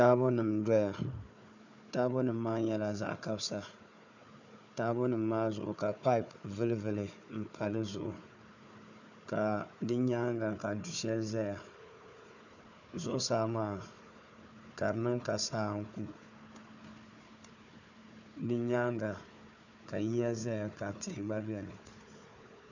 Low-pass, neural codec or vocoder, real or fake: 7.2 kHz; codec, 16 kHz, 4 kbps, FunCodec, trained on Chinese and English, 50 frames a second; fake